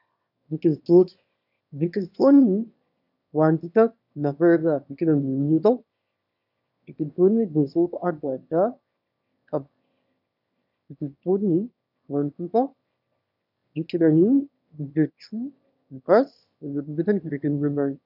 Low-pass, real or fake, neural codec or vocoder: 5.4 kHz; fake; autoencoder, 22.05 kHz, a latent of 192 numbers a frame, VITS, trained on one speaker